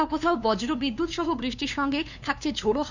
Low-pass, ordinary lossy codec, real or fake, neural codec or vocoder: 7.2 kHz; none; fake; codec, 16 kHz, 2 kbps, FunCodec, trained on LibriTTS, 25 frames a second